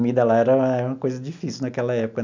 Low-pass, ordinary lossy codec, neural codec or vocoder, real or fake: 7.2 kHz; none; none; real